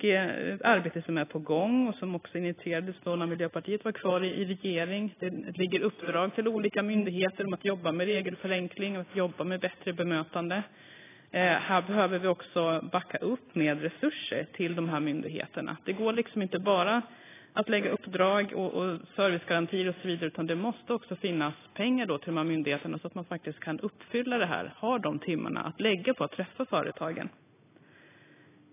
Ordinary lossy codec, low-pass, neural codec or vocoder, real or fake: AAC, 16 kbps; 3.6 kHz; none; real